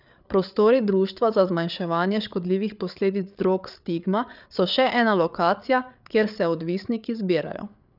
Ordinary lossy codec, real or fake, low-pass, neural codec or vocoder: none; fake; 5.4 kHz; codec, 16 kHz, 8 kbps, FreqCodec, larger model